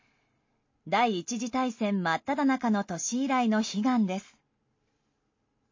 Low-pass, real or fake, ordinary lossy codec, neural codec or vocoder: 7.2 kHz; real; MP3, 32 kbps; none